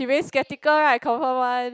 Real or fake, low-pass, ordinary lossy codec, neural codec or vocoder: real; none; none; none